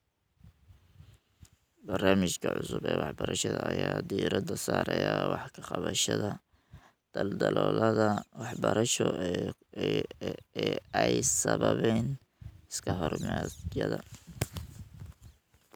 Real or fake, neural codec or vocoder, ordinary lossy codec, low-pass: real; none; none; none